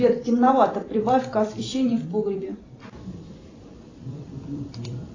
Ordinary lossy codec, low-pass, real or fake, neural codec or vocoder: AAC, 32 kbps; 7.2 kHz; fake; vocoder, 44.1 kHz, 128 mel bands every 256 samples, BigVGAN v2